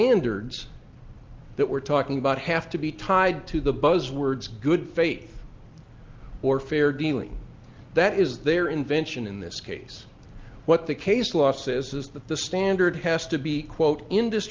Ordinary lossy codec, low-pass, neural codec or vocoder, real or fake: Opus, 32 kbps; 7.2 kHz; none; real